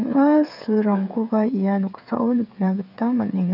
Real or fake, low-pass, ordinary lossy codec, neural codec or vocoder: fake; 5.4 kHz; none; codec, 16 kHz, 8 kbps, FreqCodec, smaller model